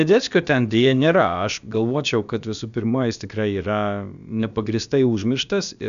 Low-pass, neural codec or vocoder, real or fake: 7.2 kHz; codec, 16 kHz, about 1 kbps, DyCAST, with the encoder's durations; fake